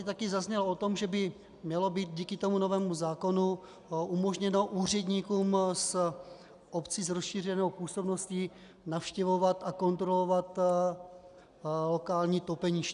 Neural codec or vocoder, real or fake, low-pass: none; real; 10.8 kHz